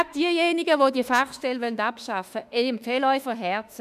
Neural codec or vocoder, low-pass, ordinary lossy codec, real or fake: autoencoder, 48 kHz, 32 numbers a frame, DAC-VAE, trained on Japanese speech; 14.4 kHz; none; fake